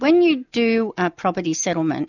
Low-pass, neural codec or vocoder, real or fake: 7.2 kHz; none; real